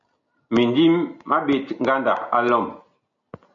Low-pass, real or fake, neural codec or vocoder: 7.2 kHz; real; none